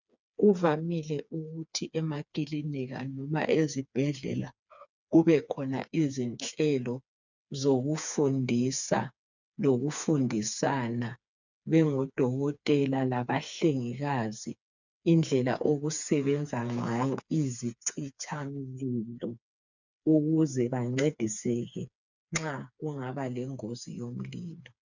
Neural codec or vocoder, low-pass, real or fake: codec, 16 kHz, 4 kbps, FreqCodec, smaller model; 7.2 kHz; fake